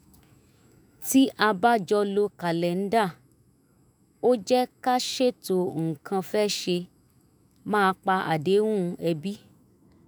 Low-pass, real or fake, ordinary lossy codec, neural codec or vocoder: none; fake; none; autoencoder, 48 kHz, 128 numbers a frame, DAC-VAE, trained on Japanese speech